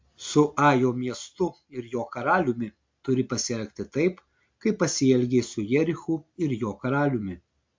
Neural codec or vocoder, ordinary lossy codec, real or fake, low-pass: none; MP3, 48 kbps; real; 7.2 kHz